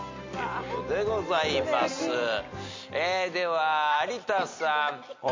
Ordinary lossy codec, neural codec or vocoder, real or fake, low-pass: MP3, 48 kbps; none; real; 7.2 kHz